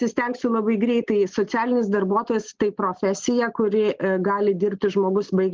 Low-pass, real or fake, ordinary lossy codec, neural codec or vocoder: 7.2 kHz; real; Opus, 16 kbps; none